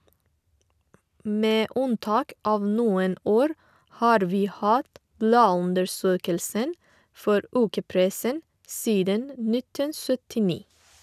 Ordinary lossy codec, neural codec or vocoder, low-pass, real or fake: none; none; 14.4 kHz; real